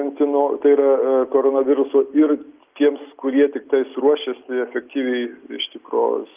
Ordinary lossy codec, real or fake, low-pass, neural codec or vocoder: Opus, 32 kbps; real; 3.6 kHz; none